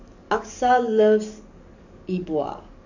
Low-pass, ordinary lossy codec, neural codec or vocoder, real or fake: 7.2 kHz; none; codec, 44.1 kHz, 7.8 kbps, DAC; fake